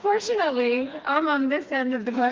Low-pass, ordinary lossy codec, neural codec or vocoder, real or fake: 7.2 kHz; Opus, 24 kbps; codec, 16 kHz, 2 kbps, FreqCodec, smaller model; fake